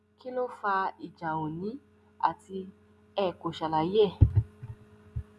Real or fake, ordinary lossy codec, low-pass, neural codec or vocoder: real; none; none; none